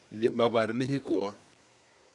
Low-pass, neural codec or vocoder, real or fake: 10.8 kHz; codec, 24 kHz, 1 kbps, SNAC; fake